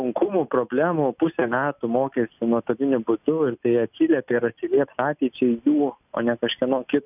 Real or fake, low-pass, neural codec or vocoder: real; 3.6 kHz; none